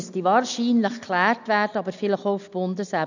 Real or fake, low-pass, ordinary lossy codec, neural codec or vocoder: real; 7.2 kHz; none; none